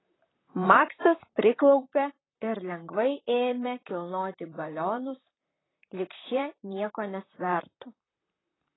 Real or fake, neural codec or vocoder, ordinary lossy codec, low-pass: fake; vocoder, 44.1 kHz, 128 mel bands, Pupu-Vocoder; AAC, 16 kbps; 7.2 kHz